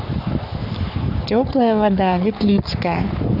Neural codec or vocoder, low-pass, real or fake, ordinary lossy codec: codec, 44.1 kHz, 3.4 kbps, Pupu-Codec; 5.4 kHz; fake; none